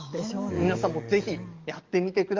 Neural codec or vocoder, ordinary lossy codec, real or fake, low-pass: codec, 44.1 kHz, 7.8 kbps, DAC; Opus, 32 kbps; fake; 7.2 kHz